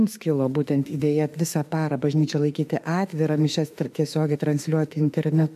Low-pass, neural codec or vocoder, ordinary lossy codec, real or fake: 14.4 kHz; autoencoder, 48 kHz, 32 numbers a frame, DAC-VAE, trained on Japanese speech; AAC, 96 kbps; fake